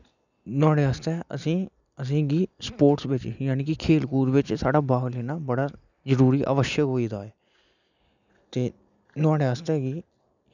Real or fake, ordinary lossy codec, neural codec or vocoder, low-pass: real; none; none; 7.2 kHz